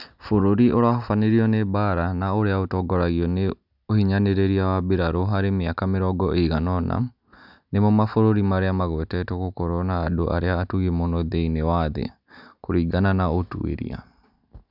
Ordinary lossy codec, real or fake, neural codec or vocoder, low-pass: none; real; none; 5.4 kHz